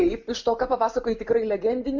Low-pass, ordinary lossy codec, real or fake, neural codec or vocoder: 7.2 kHz; MP3, 48 kbps; real; none